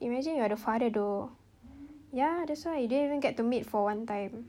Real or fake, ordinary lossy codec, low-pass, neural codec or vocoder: real; none; 19.8 kHz; none